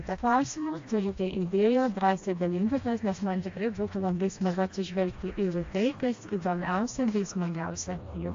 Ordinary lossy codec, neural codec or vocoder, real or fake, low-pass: AAC, 48 kbps; codec, 16 kHz, 1 kbps, FreqCodec, smaller model; fake; 7.2 kHz